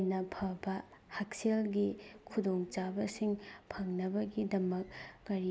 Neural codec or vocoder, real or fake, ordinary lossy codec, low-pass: none; real; none; none